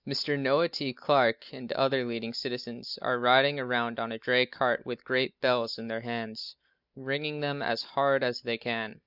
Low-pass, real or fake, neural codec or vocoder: 5.4 kHz; real; none